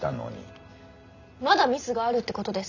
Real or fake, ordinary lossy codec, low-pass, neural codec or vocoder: real; none; 7.2 kHz; none